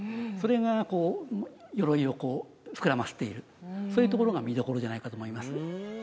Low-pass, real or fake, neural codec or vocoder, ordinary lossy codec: none; real; none; none